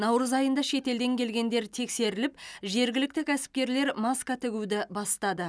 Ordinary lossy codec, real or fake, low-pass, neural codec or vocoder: none; real; none; none